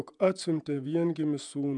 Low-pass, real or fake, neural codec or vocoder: 10.8 kHz; fake; vocoder, 48 kHz, 128 mel bands, Vocos